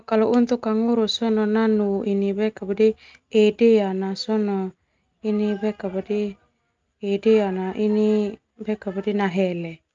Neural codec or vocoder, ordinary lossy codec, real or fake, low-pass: none; Opus, 24 kbps; real; 7.2 kHz